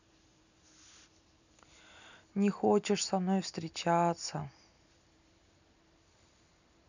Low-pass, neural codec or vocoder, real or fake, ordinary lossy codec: 7.2 kHz; none; real; none